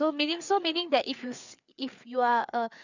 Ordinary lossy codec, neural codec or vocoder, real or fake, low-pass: none; codec, 16 kHz, 4 kbps, FreqCodec, larger model; fake; 7.2 kHz